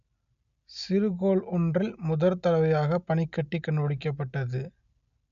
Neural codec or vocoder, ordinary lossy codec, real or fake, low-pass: none; none; real; 7.2 kHz